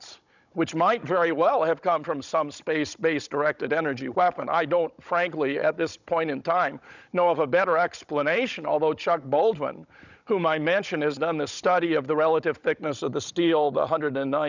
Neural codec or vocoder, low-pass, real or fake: codec, 16 kHz, 16 kbps, FunCodec, trained on Chinese and English, 50 frames a second; 7.2 kHz; fake